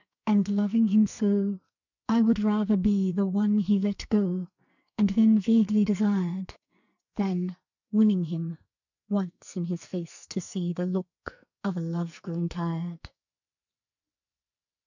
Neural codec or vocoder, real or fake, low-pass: codec, 44.1 kHz, 2.6 kbps, SNAC; fake; 7.2 kHz